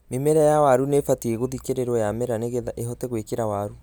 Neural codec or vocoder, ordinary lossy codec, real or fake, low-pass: none; none; real; none